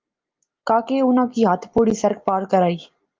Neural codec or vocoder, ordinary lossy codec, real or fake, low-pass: none; Opus, 32 kbps; real; 7.2 kHz